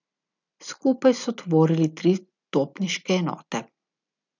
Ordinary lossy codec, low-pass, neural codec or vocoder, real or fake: none; 7.2 kHz; none; real